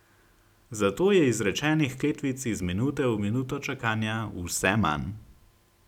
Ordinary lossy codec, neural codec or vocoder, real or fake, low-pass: none; none; real; 19.8 kHz